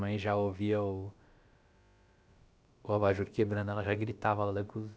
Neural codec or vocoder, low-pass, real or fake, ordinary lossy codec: codec, 16 kHz, about 1 kbps, DyCAST, with the encoder's durations; none; fake; none